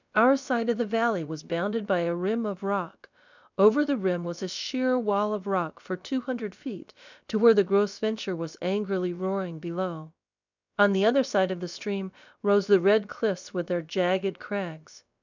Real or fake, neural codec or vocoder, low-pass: fake; codec, 16 kHz, about 1 kbps, DyCAST, with the encoder's durations; 7.2 kHz